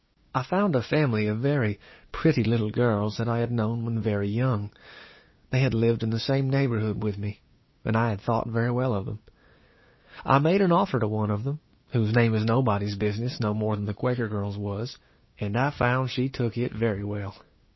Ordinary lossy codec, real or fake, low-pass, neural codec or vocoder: MP3, 24 kbps; fake; 7.2 kHz; codec, 16 kHz, 6 kbps, DAC